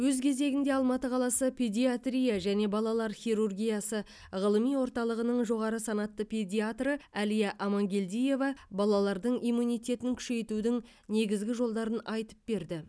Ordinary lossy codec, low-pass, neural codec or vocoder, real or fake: none; none; none; real